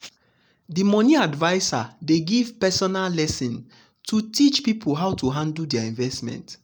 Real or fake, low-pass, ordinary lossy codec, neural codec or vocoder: real; 19.8 kHz; none; none